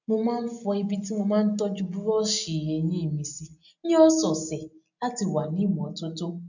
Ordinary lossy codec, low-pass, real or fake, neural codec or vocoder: none; 7.2 kHz; real; none